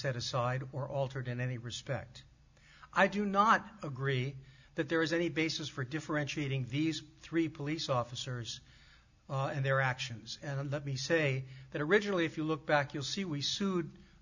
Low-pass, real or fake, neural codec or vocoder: 7.2 kHz; real; none